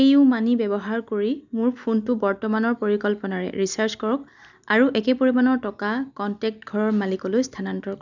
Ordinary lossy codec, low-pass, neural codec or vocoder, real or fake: none; 7.2 kHz; none; real